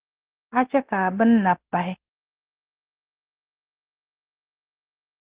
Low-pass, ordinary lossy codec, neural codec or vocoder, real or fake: 3.6 kHz; Opus, 16 kbps; none; real